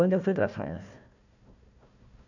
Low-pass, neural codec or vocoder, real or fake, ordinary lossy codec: 7.2 kHz; codec, 16 kHz, 1 kbps, FunCodec, trained on Chinese and English, 50 frames a second; fake; none